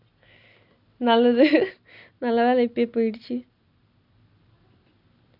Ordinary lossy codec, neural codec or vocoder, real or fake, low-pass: none; none; real; 5.4 kHz